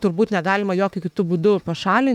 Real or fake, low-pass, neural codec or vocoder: fake; 19.8 kHz; autoencoder, 48 kHz, 32 numbers a frame, DAC-VAE, trained on Japanese speech